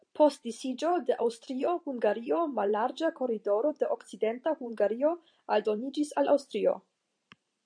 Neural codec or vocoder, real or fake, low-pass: vocoder, 44.1 kHz, 128 mel bands every 512 samples, BigVGAN v2; fake; 9.9 kHz